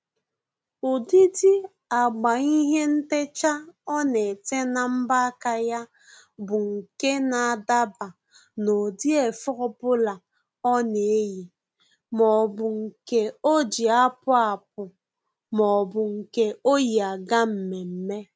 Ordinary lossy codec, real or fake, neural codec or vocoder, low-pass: none; real; none; none